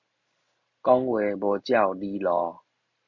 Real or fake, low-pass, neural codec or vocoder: real; 7.2 kHz; none